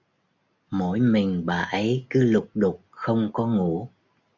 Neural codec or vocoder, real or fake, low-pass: none; real; 7.2 kHz